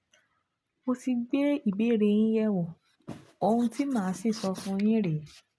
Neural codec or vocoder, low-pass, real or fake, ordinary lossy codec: none; none; real; none